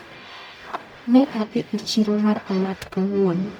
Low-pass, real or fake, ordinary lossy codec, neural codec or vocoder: 19.8 kHz; fake; none; codec, 44.1 kHz, 0.9 kbps, DAC